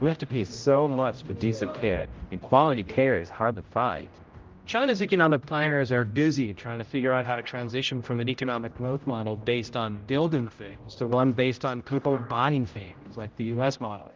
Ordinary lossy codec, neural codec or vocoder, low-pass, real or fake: Opus, 32 kbps; codec, 16 kHz, 0.5 kbps, X-Codec, HuBERT features, trained on general audio; 7.2 kHz; fake